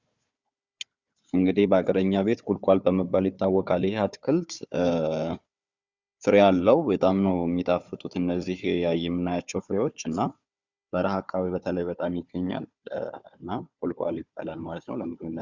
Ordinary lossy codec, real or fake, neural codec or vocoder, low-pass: Opus, 64 kbps; fake; codec, 16 kHz, 4 kbps, FunCodec, trained on Chinese and English, 50 frames a second; 7.2 kHz